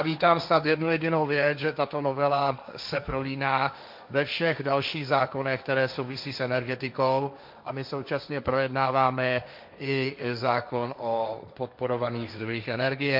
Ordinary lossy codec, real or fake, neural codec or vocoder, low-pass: MP3, 48 kbps; fake; codec, 16 kHz, 1.1 kbps, Voila-Tokenizer; 5.4 kHz